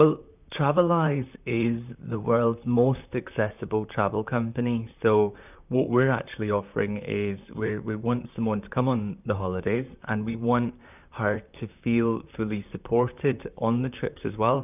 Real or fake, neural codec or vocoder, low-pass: fake; vocoder, 44.1 kHz, 128 mel bands, Pupu-Vocoder; 3.6 kHz